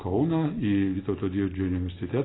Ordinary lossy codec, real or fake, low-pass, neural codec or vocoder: AAC, 16 kbps; real; 7.2 kHz; none